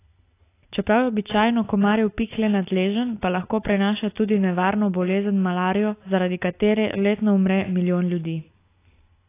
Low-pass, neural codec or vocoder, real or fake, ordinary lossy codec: 3.6 kHz; none; real; AAC, 24 kbps